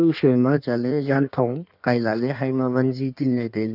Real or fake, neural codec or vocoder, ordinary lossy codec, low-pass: fake; codec, 16 kHz in and 24 kHz out, 1.1 kbps, FireRedTTS-2 codec; none; 5.4 kHz